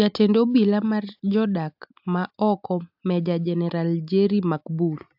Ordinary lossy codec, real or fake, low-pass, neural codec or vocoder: AAC, 48 kbps; real; 5.4 kHz; none